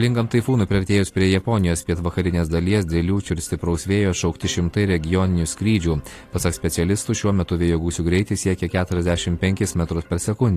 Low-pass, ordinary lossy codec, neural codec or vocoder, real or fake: 14.4 kHz; AAC, 48 kbps; none; real